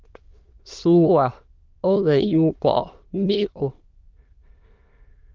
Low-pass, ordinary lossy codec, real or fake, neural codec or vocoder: 7.2 kHz; Opus, 24 kbps; fake; autoencoder, 22.05 kHz, a latent of 192 numbers a frame, VITS, trained on many speakers